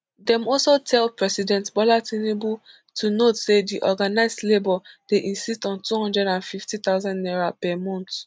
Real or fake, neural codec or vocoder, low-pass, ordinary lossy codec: real; none; none; none